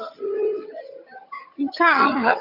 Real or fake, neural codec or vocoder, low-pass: fake; vocoder, 22.05 kHz, 80 mel bands, HiFi-GAN; 5.4 kHz